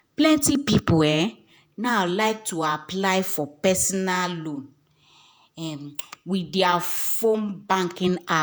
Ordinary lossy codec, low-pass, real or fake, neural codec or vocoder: none; none; fake; vocoder, 48 kHz, 128 mel bands, Vocos